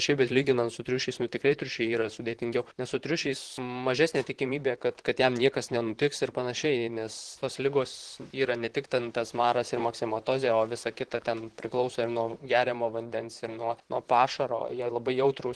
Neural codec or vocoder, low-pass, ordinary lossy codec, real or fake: vocoder, 44.1 kHz, 128 mel bands, Pupu-Vocoder; 10.8 kHz; Opus, 16 kbps; fake